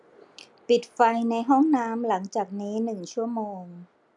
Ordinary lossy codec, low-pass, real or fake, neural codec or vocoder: none; 10.8 kHz; real; none